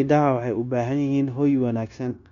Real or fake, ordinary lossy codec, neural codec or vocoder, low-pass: fake; none; codec, 16 kHz, 0.9 kbps, LongCat-Audio-Codec; 7.2 kHz